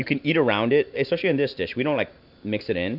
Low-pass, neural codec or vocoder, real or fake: 5.4 kHz; none; real